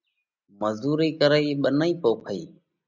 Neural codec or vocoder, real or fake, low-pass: none; real; 7.2 kHz